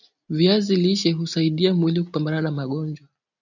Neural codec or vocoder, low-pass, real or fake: none; 7.2 kHz; real